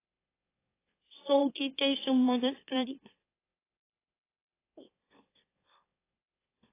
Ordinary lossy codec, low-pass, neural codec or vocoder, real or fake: AAC, 24 kbps; 3.6 kHz; autoencoder, 44.1 kHz, a latent of 192 numbers a frame, MeloTTS; fake